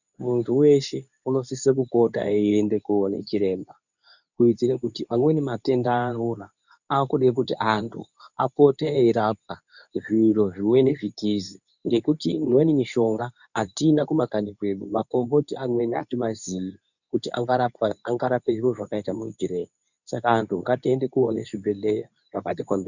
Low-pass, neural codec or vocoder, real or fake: 7.2 kHz; codec, 24 kHz, 0.9 kbps, WavTokenizer, medium speech release version 2; fake